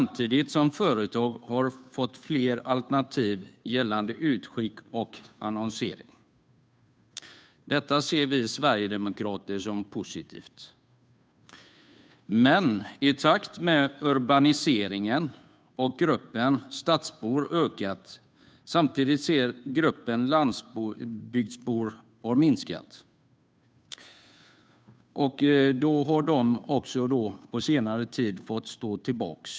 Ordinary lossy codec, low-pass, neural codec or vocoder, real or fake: none; none; codec, 16 kHz, 2 kbps, FunCodec, trained on Chinese and English, 25 frames a second; fake